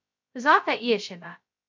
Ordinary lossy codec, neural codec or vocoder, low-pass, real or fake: MP3, 64 kbps; codec, 16 kHz, 0.2 kbps, FocalCodec; 7.2 kHz; fake